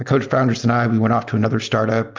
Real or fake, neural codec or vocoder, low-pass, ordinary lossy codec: real; none; 7.2 kHz; Opus, 24 kbps